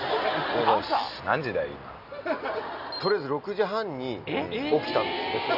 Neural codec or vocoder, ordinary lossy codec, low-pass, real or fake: none; none; 5.4 kHz; real